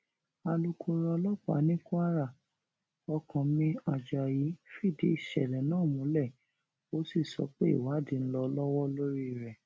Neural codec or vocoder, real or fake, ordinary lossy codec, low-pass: none; real; none; none